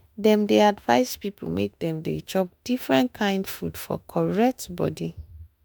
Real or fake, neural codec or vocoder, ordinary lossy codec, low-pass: fake; autoencoder, 48 kHz, 32 numbers a frame, DAC-VAE, trained on Japanese speech; none; none